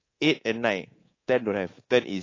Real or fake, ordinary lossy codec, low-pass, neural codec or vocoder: fake; AAC, 32 kbps; 7.2 kHz; codec, 24 kHz, 3.1 kbps, DualCodec